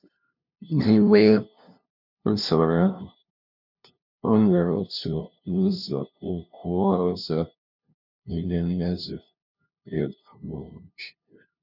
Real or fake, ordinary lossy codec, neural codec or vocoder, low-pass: fake; none; codec, 16 kHz, 0.5 kbps, FunCodec, trained on LibriTTS, 25 frames a second; 5.4 kHz